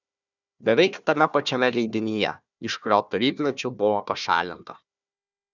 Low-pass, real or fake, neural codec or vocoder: 7.2 kHz; fake; codec, 16 kHz, 1 kbps, FunCodec, trained on Chinese and English, 50 frames a second